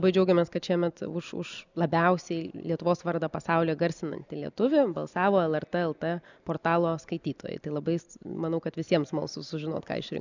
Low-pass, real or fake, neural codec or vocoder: 7.2 kHz; real; none